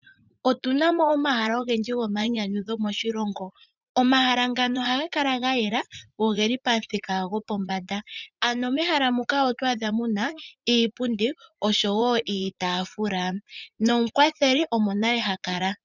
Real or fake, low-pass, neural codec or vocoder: fake; 7.2 kHz; vocoder, 44.1 kHz, 80 mel bands, Vocos